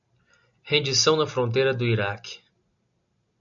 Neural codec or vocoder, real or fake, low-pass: none; real; 7.2 kHz